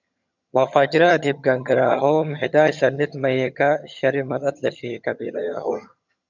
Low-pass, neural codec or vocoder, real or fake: 7.2 kHz; vocoder, 22.05 kHz, 80 mel bands, HiFi-GAN; fake